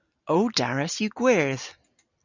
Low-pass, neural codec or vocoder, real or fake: 7.2 kHz; none; real